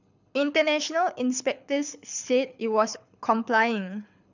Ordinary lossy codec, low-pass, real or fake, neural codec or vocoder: none; 7.2 kHz; fake; codec, 24 kHz, 6 kbps, HILCodec